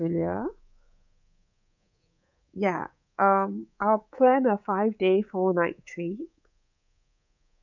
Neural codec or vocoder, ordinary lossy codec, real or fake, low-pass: codec, 24 kHz, 3.1 kbps, DualCodec; none; fake; 7.2 kHz